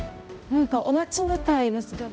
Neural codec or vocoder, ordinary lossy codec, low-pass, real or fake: codec, 16 kHz, 0.5 kbps, X-Codec, HuBERT features, trained on balanced general audio; none; none; fake